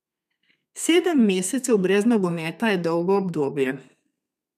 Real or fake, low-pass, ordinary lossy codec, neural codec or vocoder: fake; 14.4 kHz; none; codec, 32 kHz, 1.9 kbps, SNAC